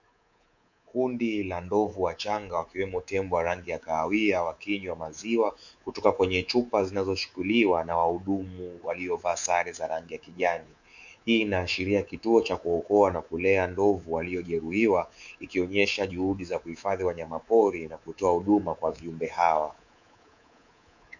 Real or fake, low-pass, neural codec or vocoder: fake; 7.2 kHz; codec, 24 kHz, 3.1 kbps, DualCodec